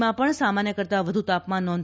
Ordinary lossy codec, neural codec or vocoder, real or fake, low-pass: none; none; real; none